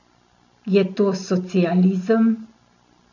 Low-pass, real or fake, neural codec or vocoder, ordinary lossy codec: 7.2 kHz; real; none; none